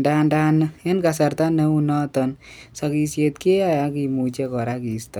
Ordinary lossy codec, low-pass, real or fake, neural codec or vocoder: none; none; real; none